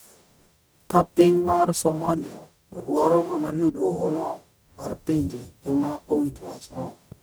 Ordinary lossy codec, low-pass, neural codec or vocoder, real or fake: none; none; codec, 44.1 kHz, 0.9 kbps, DAC; fake